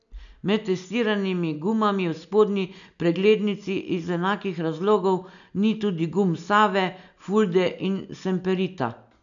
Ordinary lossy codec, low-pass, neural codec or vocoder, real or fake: none; 7.2 kHz; none; real